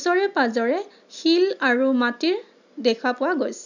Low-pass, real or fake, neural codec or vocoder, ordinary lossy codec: 7.2 kHz; real; none; none